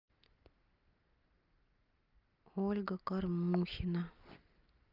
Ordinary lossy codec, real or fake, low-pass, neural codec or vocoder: Opus, 24 kbps; real; 5.4 kHz; none